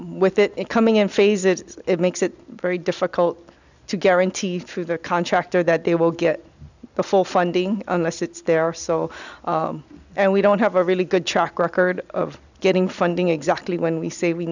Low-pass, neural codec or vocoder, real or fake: 7.2 kHz; none; real